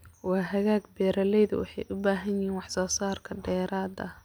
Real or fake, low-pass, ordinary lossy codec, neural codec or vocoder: real; none; none; none